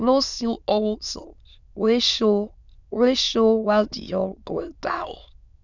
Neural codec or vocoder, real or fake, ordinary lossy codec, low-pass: autoencoder, 22.05 kHz, a latent of 192 numbers a frame, VITS, trained on many speakers; fake; none; 7.2 kHz